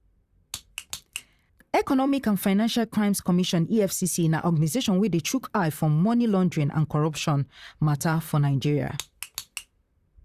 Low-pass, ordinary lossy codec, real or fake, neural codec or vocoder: 14.4 kHz; Opus, 64 kbps; fake; vocoder, 44.1 kHz, 128 mel bands, Pupu-Vocoder